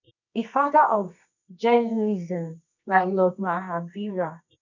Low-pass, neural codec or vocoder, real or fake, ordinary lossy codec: 7.2 kHz; codec, 24 kHz, 0.9 kbps, WavTokenizer, medium music audio release; fake; none